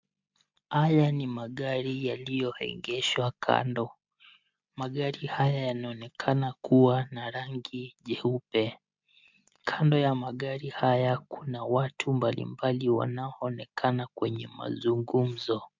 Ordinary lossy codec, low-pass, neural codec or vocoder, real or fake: MP3, 64 kbps; 7.2 kHz; none; real